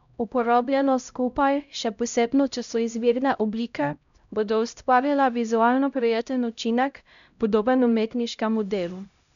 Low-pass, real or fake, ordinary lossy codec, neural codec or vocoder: 7.2 kHz; fake; none; codec, 16 kHz, 0.5 kbps, X-Codec, HuBERT features, trained on LibriSpeech